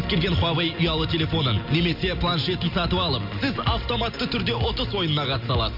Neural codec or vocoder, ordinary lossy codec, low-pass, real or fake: none; none; 5.4 kHz; real